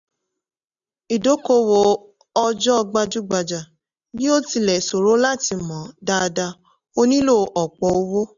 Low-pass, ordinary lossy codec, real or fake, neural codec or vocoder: 7.2 kHz; none; real; none